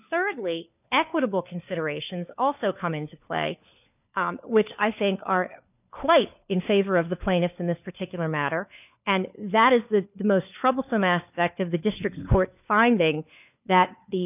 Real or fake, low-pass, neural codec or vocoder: fake; 3.6 kHz; codec, 16 kHz, 4 kbps, FunCodec, trained on LibriTTS, 50 frames a second